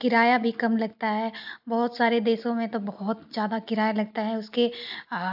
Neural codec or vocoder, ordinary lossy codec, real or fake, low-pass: none; AAC, 48 kbps; real; 5.4 kHz